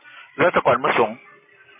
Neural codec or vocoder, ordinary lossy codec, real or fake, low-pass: none; MP3, 16 kbps; real; 3.6 kHz